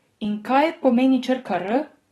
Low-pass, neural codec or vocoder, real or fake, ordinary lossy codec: 19.8 kHz; codec, 44.1 kHz, 7.8 kbps, DAC; fake; AAC, 32 kbps